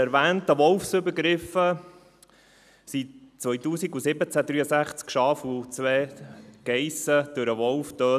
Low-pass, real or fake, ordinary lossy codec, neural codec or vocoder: 14.4 kHz; real; none; none